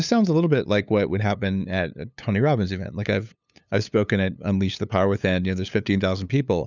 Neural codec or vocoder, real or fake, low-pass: codec, 16 kHz, 8 kbps, FunCodec, trained on LibriTTS, 25 frames a second; fake; 7.2 kHz